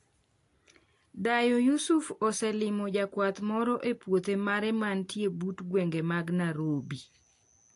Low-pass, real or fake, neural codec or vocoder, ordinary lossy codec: 10.8 kHz; real; none; AAC, 48 kbps